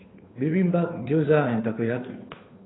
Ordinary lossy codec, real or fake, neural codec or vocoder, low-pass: AAC, 16 kbps; fake; codec, 16 kHz, 2 kbps, FunCodec, trained on Chinese and English, 25 frames a second; 7.2 kHz